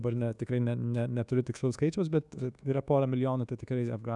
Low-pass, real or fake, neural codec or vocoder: 10.8 kHz; fake; codec, 24 kHz, 1.2 kbps, DualCodec